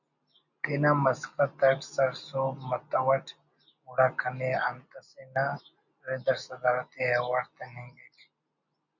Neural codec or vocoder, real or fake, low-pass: none; real; 7.2 kHz